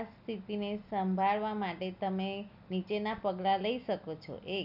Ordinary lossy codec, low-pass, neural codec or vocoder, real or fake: none; 5.4 kHz; none; real